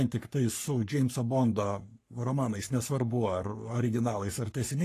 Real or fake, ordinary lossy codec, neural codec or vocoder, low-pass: fake; AAC, 48 kbps; codec, 44.1 kHz, 3.4 kbps, Pupu-Codec; 14.4 kHz